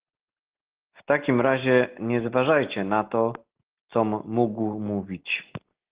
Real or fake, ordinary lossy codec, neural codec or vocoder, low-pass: real; Opus, 16 kbps; none; 3.6 kHz